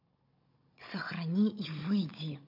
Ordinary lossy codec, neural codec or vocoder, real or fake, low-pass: MP3, 32 kbps; codec, 16 kHz, 16 kbps, FunCodec, trained on Chinese and English, 50 frames a second; fake; 5.4 kHz